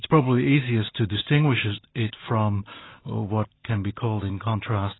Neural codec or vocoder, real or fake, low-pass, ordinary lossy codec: none; real; 7.2 kHz; AAC, 16 kbps